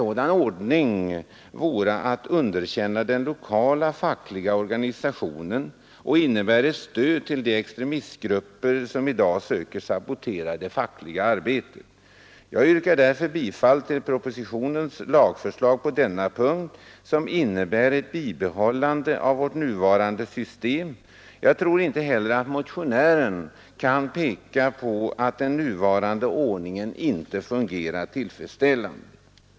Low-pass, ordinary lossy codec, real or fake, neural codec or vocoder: none; none; real; none